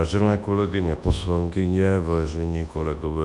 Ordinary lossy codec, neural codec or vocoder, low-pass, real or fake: MP3, 64 kbps; codec, 24 kHz, 0.9 kbps, WavTokenizer, large speech release; 10.8 kHz; fake